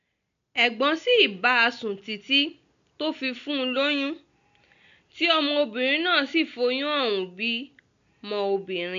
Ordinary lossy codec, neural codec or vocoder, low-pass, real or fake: AAC, 64 kbps; none; 7.2 kHz; real